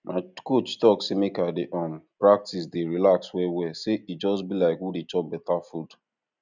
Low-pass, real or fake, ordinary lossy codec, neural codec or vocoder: 7.2 kHz; real; none; none